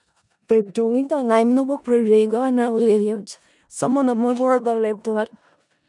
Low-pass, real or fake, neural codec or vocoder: 10.8 kHz; fake; codec, 16 kHz in and 24 kHz out, 0.4 kbps, LongCat-Audio-Codec, four codebook decoder